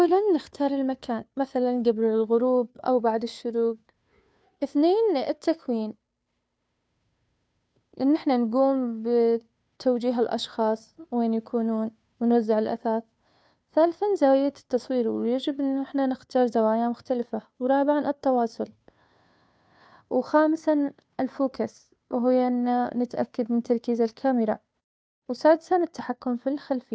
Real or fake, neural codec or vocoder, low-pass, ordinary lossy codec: fake; codec, 16 kHz, 2 kbps, FunCodec, trained on Chinese and English, 25 frames a second; none; none